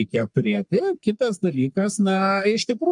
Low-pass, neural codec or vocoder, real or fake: 10.8 kHz; codec, 44.1 kHz, 3.4 kbps, Pupu-Codec; fake